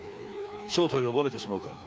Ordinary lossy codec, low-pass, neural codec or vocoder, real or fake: none; none; codec, 16 kHz, 2 kbps, FreqCodec, larger model; fake